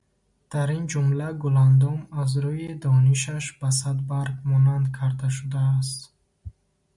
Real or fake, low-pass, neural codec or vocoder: real; 10.8 kHz; none